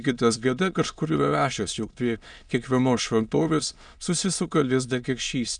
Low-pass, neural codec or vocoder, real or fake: 9.9 kHz; autoencoder, 22.05 kHz, a latent of 192 numbers a frame, VITS, trained on many speakers; fake